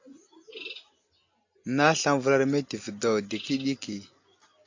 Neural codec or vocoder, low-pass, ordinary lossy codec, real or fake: none; 7.2 kHz; AAC, 48 kbps; real